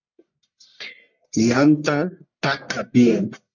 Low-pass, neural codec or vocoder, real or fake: 7.2 kHz; codec, 44.1 kHz, 1.7 kbps, Pupu-Codec; fake